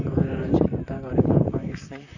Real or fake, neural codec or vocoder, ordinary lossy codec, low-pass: fake; vocoder, 44.1 kHz, 128 mel bands, Pupu-Vocoder; none; 7.2 kHz